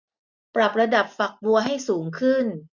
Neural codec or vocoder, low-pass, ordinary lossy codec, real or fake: none; 7.2 kHz; none; real